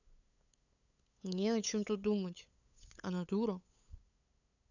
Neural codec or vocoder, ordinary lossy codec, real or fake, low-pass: codec, 16 kHz, 8 kbps, FunCodec, trained on LibriTTS, 25 frames a second; none; fake; 7.2 kHz